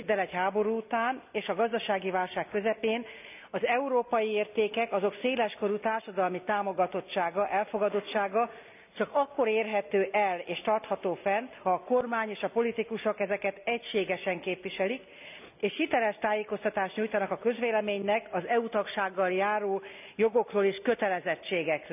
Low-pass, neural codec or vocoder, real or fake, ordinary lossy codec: 3.6 kHz; none; real; none